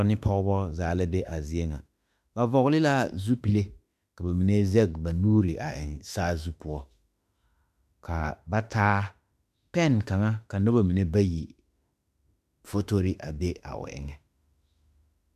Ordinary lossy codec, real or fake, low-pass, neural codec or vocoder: MP3, 96 kbps; fake; 14.4 kHz; autoencoder, 48 kHz, 32 numbers a frame, DAC-VAE, trained on Japanese speech